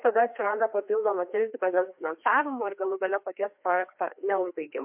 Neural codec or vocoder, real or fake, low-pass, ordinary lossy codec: codec, 16 kHz, 2 kbps, FreqCodec, larger model; fake; 3.6 kHz; MP3, 32 kbps